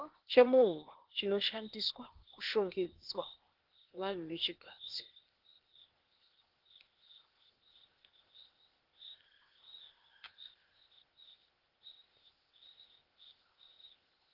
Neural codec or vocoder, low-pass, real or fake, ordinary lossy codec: codec, 16 kHz, 0.8 kbps, ZipCodec; 5.4 kHz; fake; Opus, 16 kbps